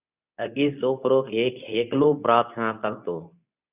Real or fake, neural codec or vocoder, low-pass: fake; codec, 24 kHz, 0.9 kbps, WavTokenizer, medium speech release version 2; 3.6 kHz